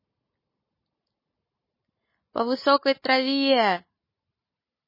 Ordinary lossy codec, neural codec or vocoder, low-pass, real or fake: MP3, 24 kbps; none; 5.4 kHz; real